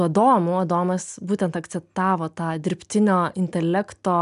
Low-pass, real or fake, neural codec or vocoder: 10.8 kHz; real; none